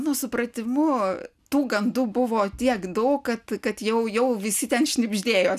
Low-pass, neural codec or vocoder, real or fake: 14.4 kHz; none; real